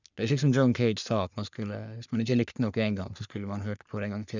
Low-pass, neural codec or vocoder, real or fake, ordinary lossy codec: 7.2 kHz; codec, 44.1 kHz, 3.4 kbps, Pupu-Codec; fake; none